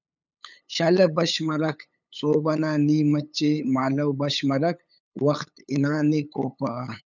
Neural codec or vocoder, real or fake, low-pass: codec, 16 kHz, 8 kbps, FunCodec, trained on LibriTTS, 25 frames a second; fake; 7.2 kHz